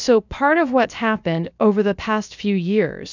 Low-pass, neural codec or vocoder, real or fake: 7.2 kHz; codec, 16 kHz, about 1 kbps, DyCAST, with the encoder's durations; fake